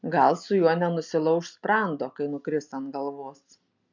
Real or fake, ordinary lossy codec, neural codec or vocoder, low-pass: real; MP3, 64 kbps; none; 7.2 kHz